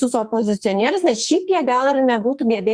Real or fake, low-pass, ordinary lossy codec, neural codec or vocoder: fake; 9.9 kHz; Opus, 64 kbps; codec, 44.1 kHz, 2.6 kbps, SNAC